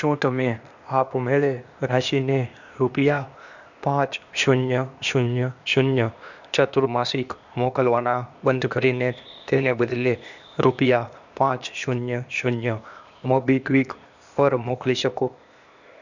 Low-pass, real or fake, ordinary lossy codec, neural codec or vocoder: 7.2 kHz; fake; none; codec, 16 kHz, 0.8 kbps, ZipCodec